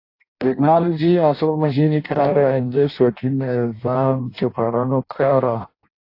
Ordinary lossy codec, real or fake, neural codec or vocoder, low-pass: AAC, 32 kbps; fake; codec, 16 kHz in and 24 kHz out, 0.6 kbps, FireRedTTS-2 codec; 5.4 kHz